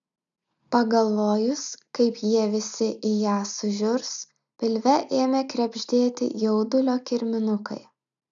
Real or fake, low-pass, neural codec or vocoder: real; 7.2 kHz; none